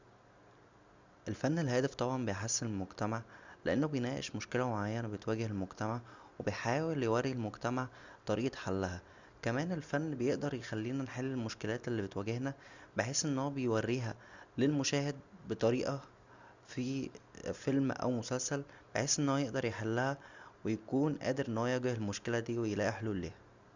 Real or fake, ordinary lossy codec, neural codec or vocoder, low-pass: real; Opus, 64 kbps; none; 7.2 kHz